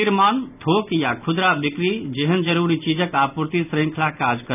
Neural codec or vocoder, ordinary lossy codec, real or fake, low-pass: none; none; real; 3.6 kHz